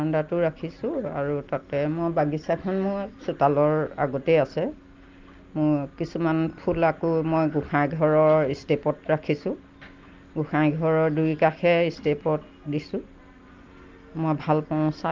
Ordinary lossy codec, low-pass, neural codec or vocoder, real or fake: Opus, 32 kbps; 7.2 kHz; none; real